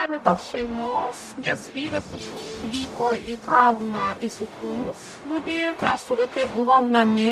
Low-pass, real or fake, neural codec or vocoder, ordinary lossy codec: 14.4 kHz; fake; codec, 44.1 kHz, 0.9 kbps, DAC; MP3, 96 kbps